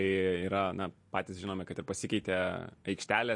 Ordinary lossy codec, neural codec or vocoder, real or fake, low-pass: MP3, 48 kbps; none; real; 10.8 kHz